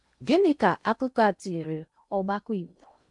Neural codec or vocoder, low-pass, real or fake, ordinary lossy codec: codec, 16 kHz in and 24 kHz out, 0.8 kbps, FocalCodec, streaming, 65536 codes; 10.8 kHz; fake; none